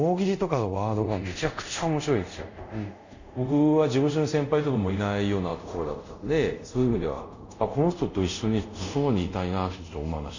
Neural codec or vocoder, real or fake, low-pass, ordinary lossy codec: codec, 24 kHz, 0.5 kbps, DualCodec; fake; 7.2 kHz; none